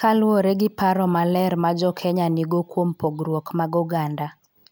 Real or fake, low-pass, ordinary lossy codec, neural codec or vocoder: real; none; none; none